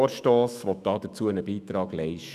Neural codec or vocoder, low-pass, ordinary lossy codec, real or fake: autoencoder, 48 kHz, 128 numbers a frame, DAC-VAE, trained on Japanese speech; 14.4 kHz; none; fake